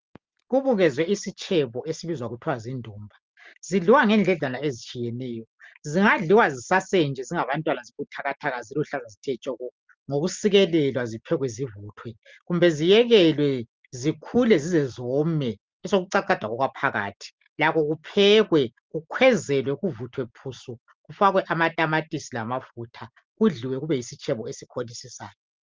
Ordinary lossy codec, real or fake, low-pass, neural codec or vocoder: Opus, 24 kbps; real; 7.2 kHz; none